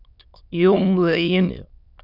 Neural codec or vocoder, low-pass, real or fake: autoencoder, 22.05 kHz, a latent of 192 numbers a frame, VITS, trained on many speakers; 5.4 kHz; fake